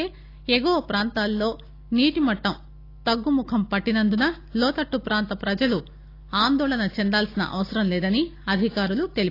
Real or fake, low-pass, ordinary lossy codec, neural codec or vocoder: real; 5.4 kHz; AAC, 32 kbps; none